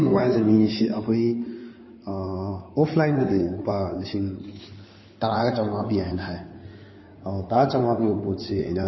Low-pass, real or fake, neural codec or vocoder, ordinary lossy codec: 7.2 kHz; fake; codec, 16 kHz in and 24 kHz out, 2.2 kbps, FireRedTTS-2 codec; MP3, 24 kbps